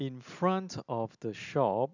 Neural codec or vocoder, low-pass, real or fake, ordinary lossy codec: none; 7.2 kHz; real; none